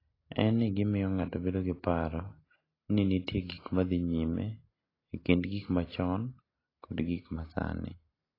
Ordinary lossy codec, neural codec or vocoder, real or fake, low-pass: AAC, 24 kbps; none; real; 5.4 kHz